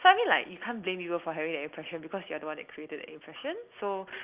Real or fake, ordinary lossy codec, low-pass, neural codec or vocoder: real; Opus, 32 kbps; 3.6 kHz; none